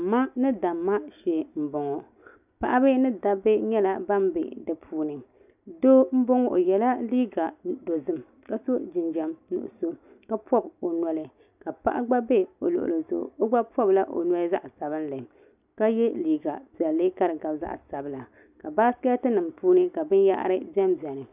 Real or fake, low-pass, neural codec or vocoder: real; 3.6 kHz; none